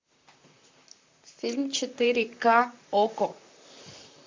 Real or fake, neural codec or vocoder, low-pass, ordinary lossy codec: fake; vocoder, 44.1 kHz, 128 mel bands, Pupu-Vocoder; 7.2 kHz; MP3, 64 kbps